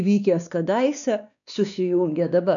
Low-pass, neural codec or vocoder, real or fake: 7.2 kHz; codec, 16 kHz, 2 kbps, X-Codec, WavLM features, trained on Multilingual LibriSpeech; fake